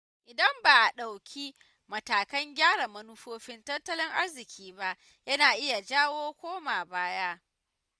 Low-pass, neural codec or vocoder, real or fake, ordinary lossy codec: none; none; real; none